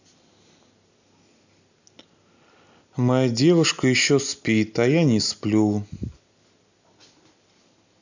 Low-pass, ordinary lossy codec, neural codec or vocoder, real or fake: 7.2 kHz; none; none; real